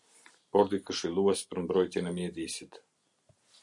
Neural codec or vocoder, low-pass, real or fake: none; 10.8 kHz; real